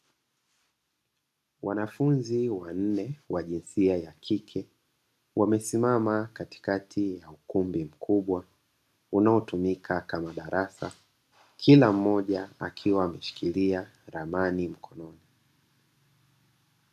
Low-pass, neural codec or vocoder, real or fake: 14.4 kHz; none; real